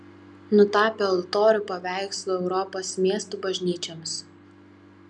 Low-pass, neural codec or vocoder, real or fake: 10.8 kHz; none; real